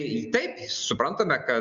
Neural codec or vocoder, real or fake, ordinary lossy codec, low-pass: none; real; Opus, 64 kbps; 7.2 kHz